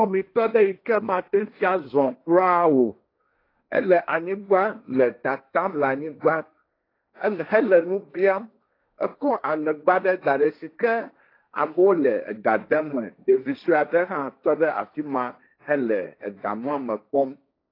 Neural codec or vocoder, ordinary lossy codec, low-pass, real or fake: codec, 16 kHz, 1.1 kbps, Voila-Tokenizer; AAC, 32 kbps; 5.4 kHz; fake